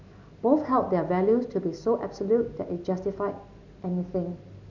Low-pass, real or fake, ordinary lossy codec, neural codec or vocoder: 7.2 kHz; real; none; none